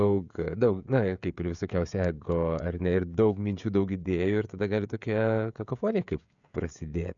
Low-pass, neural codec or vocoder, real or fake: 7.2 kHz; codec, 16 kHz, 16 kbps, FreqCodec, smaller model; fake